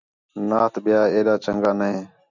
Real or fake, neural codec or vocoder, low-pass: real; none; 7.2 kHz